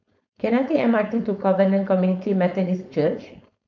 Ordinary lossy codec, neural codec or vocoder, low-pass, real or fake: none; codec, 16 kHz, 4.8 kbps, FACodec; 7.2 kHz; fake